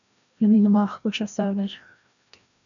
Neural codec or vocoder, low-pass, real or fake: codec, 16 kHz, 1 kbps, FreqCodec, larger model; 7.2 kHz; fake